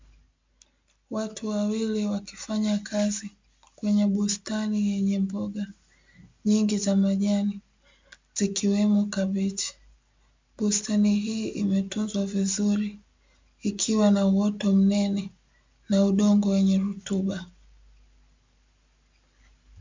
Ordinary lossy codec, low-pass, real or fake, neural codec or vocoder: MP3, 64 kbps; 7.2 kHz; real; none